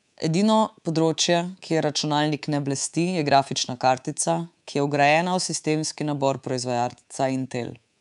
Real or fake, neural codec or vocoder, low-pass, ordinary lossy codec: fake; codec, 24 kHz, 3.1 kbps, DualCodec; 10.8 kHz; none